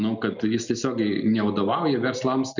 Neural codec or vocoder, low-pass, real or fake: none; 7.2 kHz; real